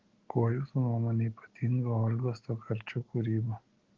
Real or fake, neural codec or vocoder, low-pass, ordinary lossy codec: real; none; 7.2 kHz; Opus, 16 kbps